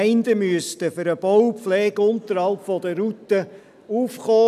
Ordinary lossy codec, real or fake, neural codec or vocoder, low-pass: none; real; none; 14.4 kHz